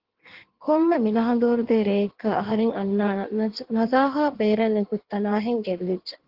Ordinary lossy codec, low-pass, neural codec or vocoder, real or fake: Opus, 16 kbps; 5.4 kHz; codec, 16 kHz in and 24 kHz out, 1.1 kbps, FireRedTTS-2 codec; fake